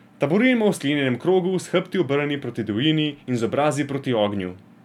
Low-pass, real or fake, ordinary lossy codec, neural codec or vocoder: 19.8 kHz; real; none; none